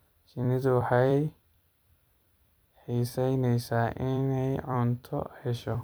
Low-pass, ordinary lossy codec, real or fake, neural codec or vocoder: none; none; fake; vocoder, 44.1 kHz, 128 mel bands every 256 samples, BigVGAN v2